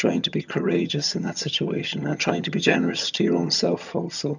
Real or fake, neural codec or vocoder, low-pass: fake; vocoder, 22.05 kHz, 80 mel bands, HiFi-GAN; 7.2 kHz